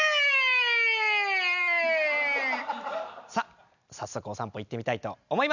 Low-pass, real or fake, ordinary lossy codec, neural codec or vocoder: 7.2 kHz; real; none; none